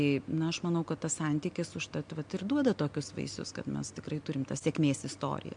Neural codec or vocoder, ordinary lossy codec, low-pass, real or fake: none; MP3, 64 kbps; 9.9 kHz; real